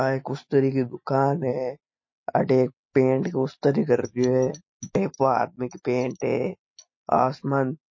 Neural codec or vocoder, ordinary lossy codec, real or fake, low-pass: none; MP3, 32 kbps; real; 7.2 kHz